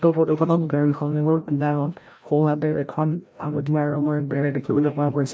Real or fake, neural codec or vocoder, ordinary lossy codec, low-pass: fake; codec, 16 kHz, 0.5 kbps, FreqCodec, larger model; none; none